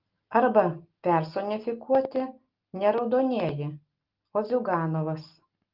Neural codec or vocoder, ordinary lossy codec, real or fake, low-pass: none; Opus, 32 kbps; real; 5.4 kHz